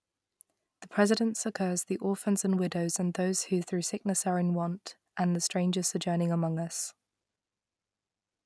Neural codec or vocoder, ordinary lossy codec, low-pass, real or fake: none; none; none; real